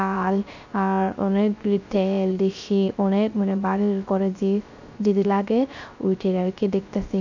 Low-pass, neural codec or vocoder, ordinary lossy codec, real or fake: 7.2 kHz; codec, 16 kHz, 0.3 kbps, FocalCodec; none; fake